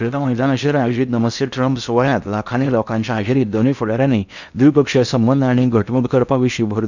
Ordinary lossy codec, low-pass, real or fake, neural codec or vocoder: none; 7.2 kHz; fake; codec, 16 kHz in and 24 kHz out, 0.8 kbps, FocalCodec, streaming, 65536 codes